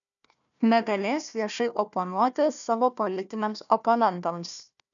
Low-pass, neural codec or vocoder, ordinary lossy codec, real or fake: 7.2 kHz; codec, 16 kHz, 1 kbps, FunCodec, trained on Chinese and English, 50 frames a second; MP3, 96 kbps; fake